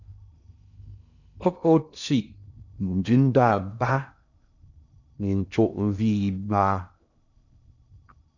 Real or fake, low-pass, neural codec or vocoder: fake; 7.2 kHz; codec, 16 kHz in and 24 kHz out, 0.6 kbps, FocalCodec, streaming, 4096 codes